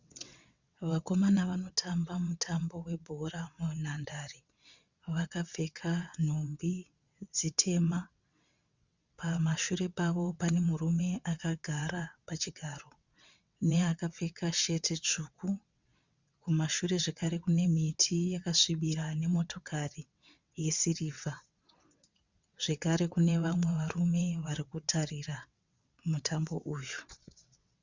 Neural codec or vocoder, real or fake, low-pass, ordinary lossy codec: vocoder, 22.05 kHz, 80 mel bands, WaveNeXt; fake; 7.2 kHz; Opus, 64 kbps